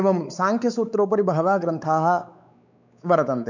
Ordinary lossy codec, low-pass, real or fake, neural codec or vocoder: none; 7.2 kHz; fake; codec, 16 kHz, 4 kbps, X-Codec, WavLM features, trained on Multilingual LibriSpeech